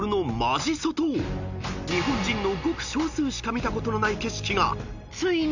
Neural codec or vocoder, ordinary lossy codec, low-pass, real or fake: none; none; 7.2 kHz; real